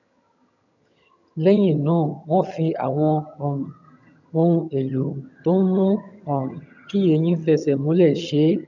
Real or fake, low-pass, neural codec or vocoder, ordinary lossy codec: fake; 7.2 kHz; vocoder, 22.05 kHz, 80 mel bands, HiFi-GAN; none